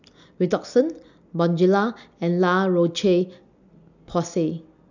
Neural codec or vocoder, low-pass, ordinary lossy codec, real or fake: none; 7.2 kHz; none; real